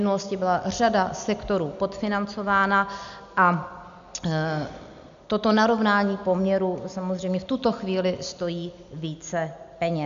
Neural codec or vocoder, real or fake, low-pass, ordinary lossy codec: none; real; 7.2 kHz; MP3, 64 kbps